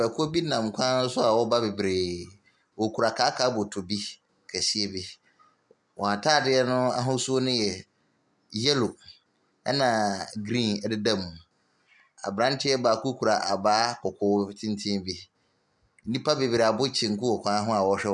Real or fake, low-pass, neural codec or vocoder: real; 10.8 kHz; none